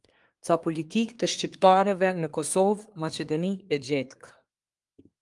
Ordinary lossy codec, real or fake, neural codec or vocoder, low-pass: Opus, 32 kbps; fake; codec, 24 kHz, 1 kbps, SNAC; 10.8 kHz